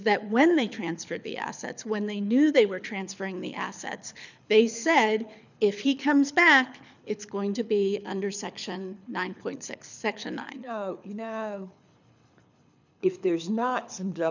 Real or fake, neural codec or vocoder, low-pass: fake; codec, 24 kHz, 6 kbps, HILCodec; 7.2 kHz